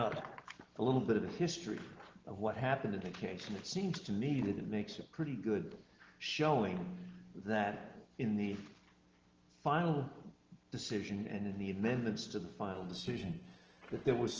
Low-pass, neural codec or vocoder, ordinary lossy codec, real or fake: 7.2 kHz; none; Opus, 16 kbps; real